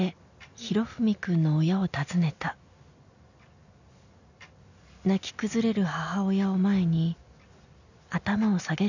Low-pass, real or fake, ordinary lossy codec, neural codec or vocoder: 7.2 kHz; real; none; none